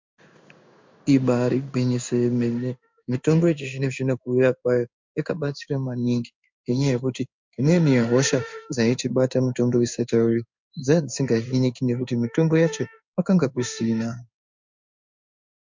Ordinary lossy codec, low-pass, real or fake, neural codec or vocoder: MP3, 64 kbps; 7.2 kHz; fake; codec, 16 kHz in and 24 kHz out, 1 kbps, XY-Tokenizer